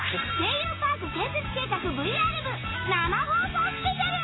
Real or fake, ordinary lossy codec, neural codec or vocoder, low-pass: real; AAC, 16 kbps; none; 7.2 kHz